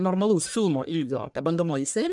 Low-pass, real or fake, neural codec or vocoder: 10.8 kHz; fake; codec, 44.1 kHz, 1.7 kbps, Pupu-Codec